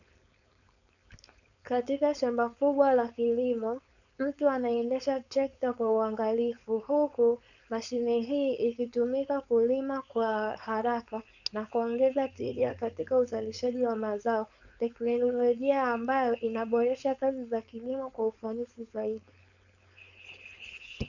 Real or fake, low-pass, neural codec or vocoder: fake; 7.2 kHz; codec, 16 kHz, 4.8 kbps, FACodec